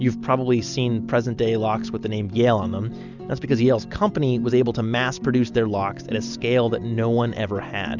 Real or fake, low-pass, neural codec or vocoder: real; 7.2 kHz; none